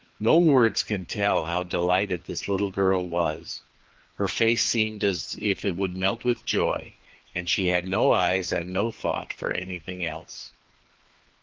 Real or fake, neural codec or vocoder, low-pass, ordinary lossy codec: fake; codec, 16 kHz, 2 kbps, FreqCodec, larger model; 7.2 kHz; Opus, 16 kbps